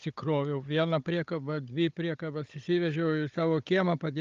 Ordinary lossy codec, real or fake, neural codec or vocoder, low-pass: Opus, 32 kbps; fake; codec, 16 kHz, 16 kbps, FunCodec, trained on Chinese and English, 50 frames a second; 7.2 kHz